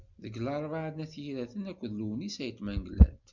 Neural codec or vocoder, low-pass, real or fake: none; 7.2 kHz; real